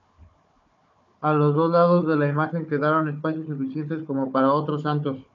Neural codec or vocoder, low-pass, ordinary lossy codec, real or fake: codec, 16 kHz, 4 kbps, FunCodec, trained on Chinese and English, 50 frames a second; 7.2 kHz; MP3, 96 kbps; fake